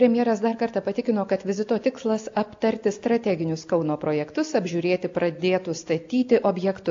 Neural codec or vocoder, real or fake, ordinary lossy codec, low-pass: none; real; AAC, 48 kbps; 7.2 kHz